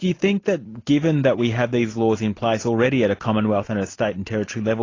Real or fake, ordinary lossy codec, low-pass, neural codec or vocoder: real; AAC, 32 kbps; 7.2 kHz; none